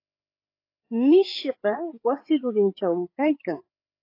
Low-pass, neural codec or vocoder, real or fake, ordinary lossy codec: 5.4 kHz; codec, 16 kHz, 4 kbps, FreqCodec, larger model; fake; AAC, 32 kbps